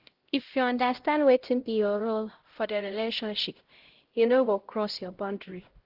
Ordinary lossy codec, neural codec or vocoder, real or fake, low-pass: Opus, 16 kbps; codec, 16 kHz, 0.5 kbps, X-Codec, HuBERT features, trained on LibriSpeech; fake; 5.4 kHz